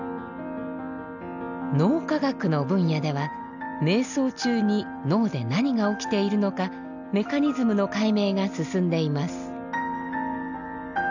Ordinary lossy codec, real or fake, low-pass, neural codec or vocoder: none; real; 7.2 kHz; none